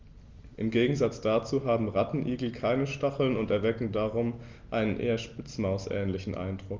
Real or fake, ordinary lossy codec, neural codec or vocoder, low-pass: real; Opus, 32 kbps; none; 7.2 kHz